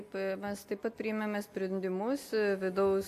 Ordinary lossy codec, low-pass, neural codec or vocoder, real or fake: AAC, 64 kbps; 14.4 kHz; none; real